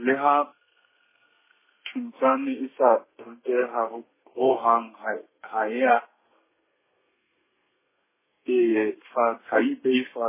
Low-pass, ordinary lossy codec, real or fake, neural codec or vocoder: 3.6 kHz; MP3, 16 kbps; fake; codec, 32 kHz, 1.9 kbps, SNAC